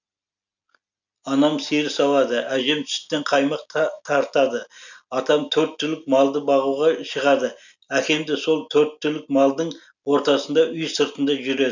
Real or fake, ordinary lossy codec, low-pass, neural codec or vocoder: real; none; 7.2 kHz; none